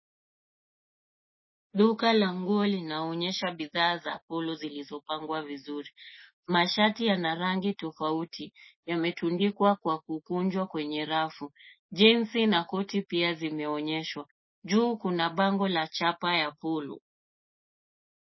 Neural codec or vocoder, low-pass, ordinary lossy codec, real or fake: none; 7.2 kHz; MP3, 24 kbps; real